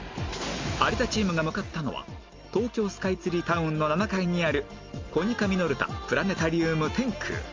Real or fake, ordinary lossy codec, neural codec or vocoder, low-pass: real; Opus, 32 kbps; none; 7.2 kHz